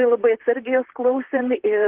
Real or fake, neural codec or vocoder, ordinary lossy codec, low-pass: fake; vocoder, 44.1 kHz, 128 mel bands, Pupu-Vocoder; Opus, 16 kbps; 3.6 kHz